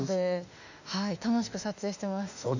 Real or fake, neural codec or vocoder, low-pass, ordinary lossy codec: fake; autoencoder, 48 kHz, 32 numbers a frame, DAC-VAE, trained on Japanese speech; 7.2 kHz; none